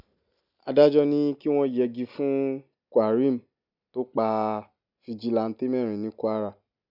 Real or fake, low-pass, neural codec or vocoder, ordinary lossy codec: real; 5.4 kHz; none; none